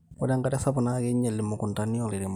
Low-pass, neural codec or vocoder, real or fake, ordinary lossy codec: 19.8 kHz; none; real; none